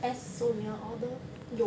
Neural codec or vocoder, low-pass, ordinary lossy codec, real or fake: none; none; none; real